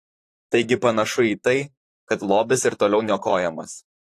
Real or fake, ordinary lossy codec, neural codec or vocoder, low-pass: fake; AAC, 48 kbps; vocoder, 44.1 kHz, 128 mel bands, Pupu-Vocoder; 14.4 kHz